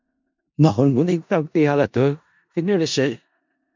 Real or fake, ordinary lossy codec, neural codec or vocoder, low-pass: fake; MP3, 64 kbps; codec, 16 kHz in and 24 kHz out, 0.4 kbps, LongCat-Audio-Codec, four codebook decoder; 7.2 kHz